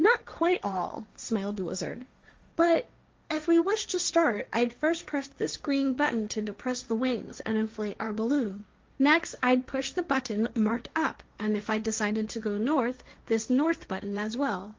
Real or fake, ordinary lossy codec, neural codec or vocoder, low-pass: fake; Opus, 32 kbps; codec, 16 kHz, 1.1 kbps, Voila-Tokenizer; 7.2 kHz